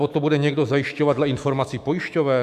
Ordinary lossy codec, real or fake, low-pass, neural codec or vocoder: MP3, 96 kbps; fake; 14.4 kHz; autoencoder, 48 kHz, 128 numbers a frame, DAC-VAE, trained on Japanese speech